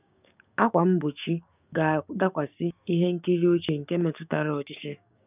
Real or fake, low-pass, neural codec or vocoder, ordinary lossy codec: fake; 3.6 kHz; codec, 16 kHz, 6 kbps, DAC; none